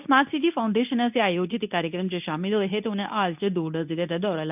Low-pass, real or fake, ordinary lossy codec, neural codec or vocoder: 3.6 kHz; fake; none; codec, 24 kHz, 0.9 kbps, WavTokenizer, medium speech release version 2